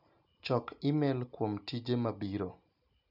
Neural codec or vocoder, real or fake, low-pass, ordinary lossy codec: none; real; 5.4 kHz; none